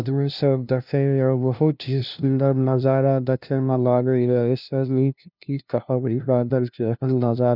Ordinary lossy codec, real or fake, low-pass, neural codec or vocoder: none; fake; 5.4 kHz; codec, 16 kHz, 0.5 kbps, FunCodec, trained on LibriTTS, 25 frames a second